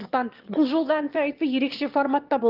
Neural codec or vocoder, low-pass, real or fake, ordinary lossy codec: autoencoder, 22.05 kHz, a latent of 192 numbers a frame, VITS, trained on one speaker; 5.4 kHz; fake; Opus, 24 kbps